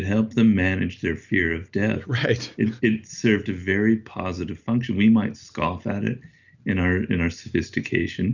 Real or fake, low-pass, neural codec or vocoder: real; 7.2 kHz; none